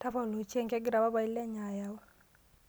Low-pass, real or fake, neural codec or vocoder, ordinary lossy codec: none; real; none; none